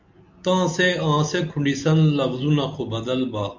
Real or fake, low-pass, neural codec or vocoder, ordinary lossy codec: real; 7.2 kHz; none; MP3, 64 kbps